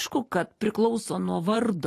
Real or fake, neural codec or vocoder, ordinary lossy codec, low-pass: fake; vocoder, 44.1 kHz, 128 mel bands every 512 samples, BigVGAN v2; AAC, 48 kbps; 14.4 kHz